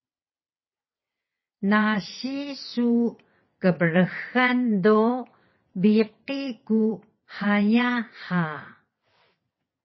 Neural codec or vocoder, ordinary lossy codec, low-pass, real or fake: vocoder, 22.05 kHz, 80 mel bands, WaveNeXt; MP3, 24 kbps; 7.2 kHz; fake